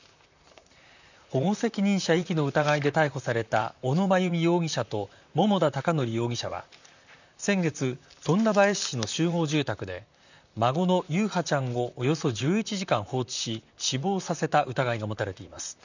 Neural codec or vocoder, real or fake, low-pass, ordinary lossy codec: vocoder, 44.1 kHz, 128 mel bands, Pupu-Vocoder; fake; 7.2 kHz; none